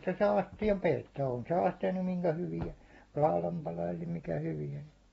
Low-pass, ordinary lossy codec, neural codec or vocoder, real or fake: 19.8 kHz; AAC, 24 kbps; none; real